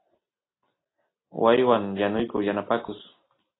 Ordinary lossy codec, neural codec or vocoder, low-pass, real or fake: AAC, 16 kbps; none; 7.2 kHz; real